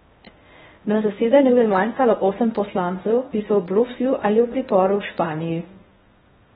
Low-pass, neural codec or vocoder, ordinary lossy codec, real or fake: 10.8 kHz; codec, 16 kHz in and 24 kHz out, 0.6 kbps, FocalCodec, streaming, 2048 codes; AAC, 16 kbps; fake